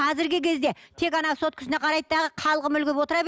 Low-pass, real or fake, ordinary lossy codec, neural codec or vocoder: none; real; none; none